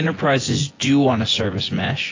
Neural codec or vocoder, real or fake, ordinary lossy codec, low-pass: vocoder, 24 kHz, 100 mel bands, Vocos; fake; AAC, 32 kbps; 7.2 kHz